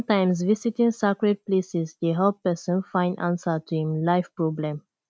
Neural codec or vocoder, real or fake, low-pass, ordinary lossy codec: none; real; none; none